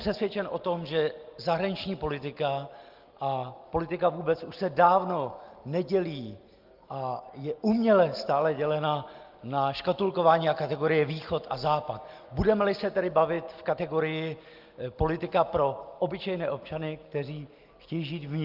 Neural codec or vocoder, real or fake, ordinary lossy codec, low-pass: none; real; Opus, 32 kbps; 5.4 kHz